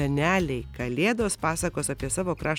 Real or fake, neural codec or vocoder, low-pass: real; none; 19.8 kHz